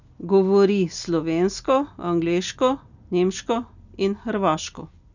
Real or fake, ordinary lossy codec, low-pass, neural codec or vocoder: real; none; 7.2 kHz; none